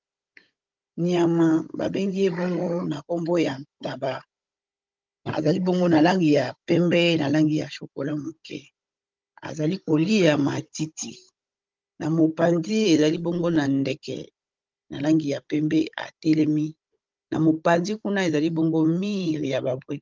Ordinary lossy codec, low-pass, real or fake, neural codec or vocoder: Opus, 24 kbps; 7.2 kHz; fake; codec, 16 kHz, 16 kbps, FunCodec, trained on Chinese and English, 50 frames a second